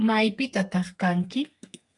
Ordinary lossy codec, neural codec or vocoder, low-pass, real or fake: AAC, 64 kbps; codec, 32 kHz, 1.9 kbps, SNAC; 10.8 kHz; fake